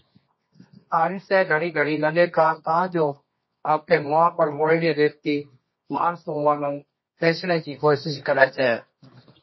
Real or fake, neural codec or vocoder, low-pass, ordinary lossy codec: fake; codec, 24 kHz, 0.9 kbps, WavTokenizer, medium music audio release; 7.2 kHz; MP3, 24 kbps